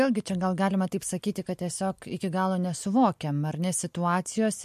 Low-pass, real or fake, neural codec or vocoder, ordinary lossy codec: 14.4 kHz; real; none; MP3, 64 kbps